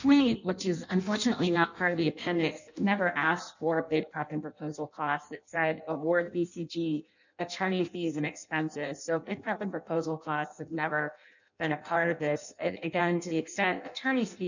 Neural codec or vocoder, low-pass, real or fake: codec, 16 kHz in and 24 kHz out, 0.6 kbps, FireRedTTS-2 codec; 7.2 kHz; fake